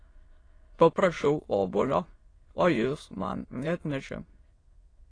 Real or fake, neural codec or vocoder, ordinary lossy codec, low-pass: fake; autoencoder, 22.05 kHz, a latent of 192 numbers a frame, VITS, trained on many speakers; AAC, 32 kbps; 9.9 kHz